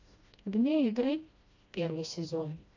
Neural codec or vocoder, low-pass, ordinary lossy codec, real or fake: codec, 16 kHz, 1 kbps, FreqCodec, smaller model; 7.2 kHz; AAC, 48 kbps; fake